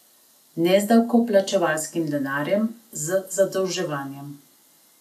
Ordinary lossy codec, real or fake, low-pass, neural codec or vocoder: none; real; 14.4 kHz; none